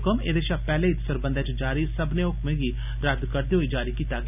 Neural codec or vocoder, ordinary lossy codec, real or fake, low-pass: none; none; real; 3.6 kHz